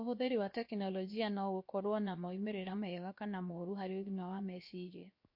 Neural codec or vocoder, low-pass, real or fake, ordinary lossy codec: codec, 16 kHz, 0.7 kbps, FocalCodec; 5.4 kHz; fake; MP3, 32 kbps